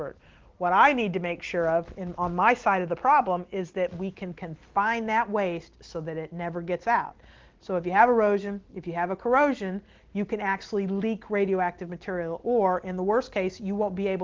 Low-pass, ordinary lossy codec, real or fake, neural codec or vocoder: 7.2 kHz; Opus, 16 kbps; real; none